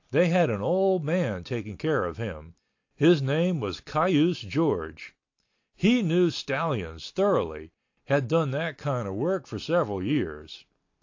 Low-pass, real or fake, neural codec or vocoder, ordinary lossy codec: 7.2 kHz; real; none; AAC, 48 kbps